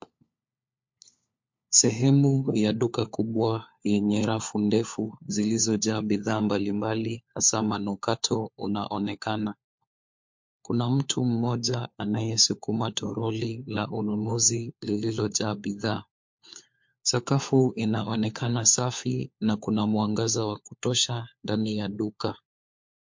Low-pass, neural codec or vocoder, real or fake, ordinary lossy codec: 7.2 kHz; codec, 16 kHz, 4 kbps, FunCodec, trained on LibriTTS, 50 frames a second; fake; MP3, 48 kbps